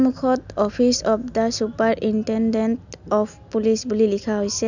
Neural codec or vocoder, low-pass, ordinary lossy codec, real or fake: none; 7.2 kHz; none; real